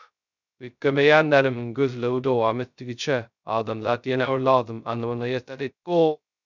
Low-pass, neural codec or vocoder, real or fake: 7.2 kHz; codec, 16 kHz, 0.2 kbps, FocalCodec; fake